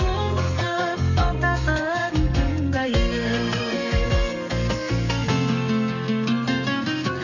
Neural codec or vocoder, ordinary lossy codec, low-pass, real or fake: codec, 32 kHz, 1.9 kbps, SNAC; none; 7.2 kHz; fake